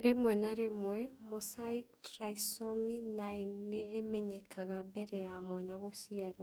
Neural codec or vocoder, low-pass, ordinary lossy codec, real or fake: codec, 44.1 kHz, 2.6 kbps, DAC; none; none; fake